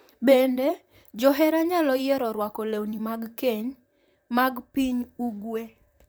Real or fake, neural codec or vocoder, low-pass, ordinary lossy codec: fake; vocoder, 44.1 kHz, 128 mel bands, Pupu-Vocoder; none; none